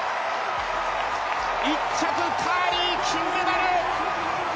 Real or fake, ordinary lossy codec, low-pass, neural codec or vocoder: real; none; none; none